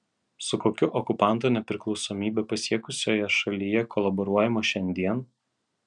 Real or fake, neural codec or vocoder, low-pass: real; none; 9.9 kHz